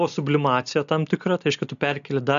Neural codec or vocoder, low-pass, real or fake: none; 7.2 kHz; real